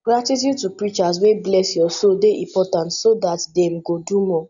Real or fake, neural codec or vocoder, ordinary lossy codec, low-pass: real; none; none; 7.2 kHz